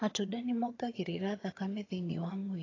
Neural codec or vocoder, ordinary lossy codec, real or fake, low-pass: vocoder, 22.05 kHz, 80 mel bands, HiFi-GAN; AAC, 32 kbps; fake; 7.2 kHz